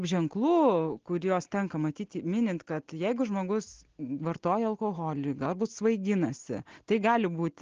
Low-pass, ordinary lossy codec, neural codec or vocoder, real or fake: 7.2 kHz; Opus, 16 kbps; none; real